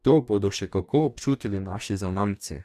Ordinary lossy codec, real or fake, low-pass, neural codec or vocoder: none; fake; 14.4 kHz; codec, 44.1 kHz, 2.6 kbps, DAC